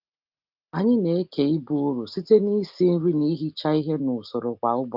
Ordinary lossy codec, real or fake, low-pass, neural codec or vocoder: Opus, 32 kbps; real; 5.4 kHz; none